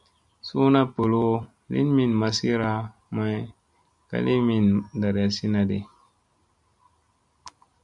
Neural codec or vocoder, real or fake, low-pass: none; real; 10.8 kHz